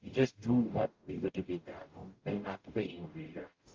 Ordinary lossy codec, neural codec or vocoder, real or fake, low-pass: Opus, 32 kbps; codec, 44.1 kHz, 0.9 kbps, DAC; fake; 7.2 kHz